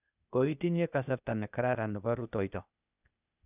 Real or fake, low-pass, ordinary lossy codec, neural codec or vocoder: fake; 3.6 kHz; none; codec, 16 kHz, 0.8 kbps, ZipCodec